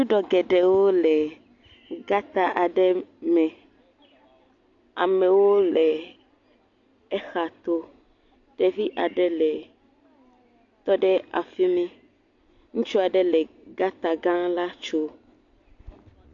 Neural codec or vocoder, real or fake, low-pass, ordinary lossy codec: none; real; 7.2 kHz; AAC, 48 kbps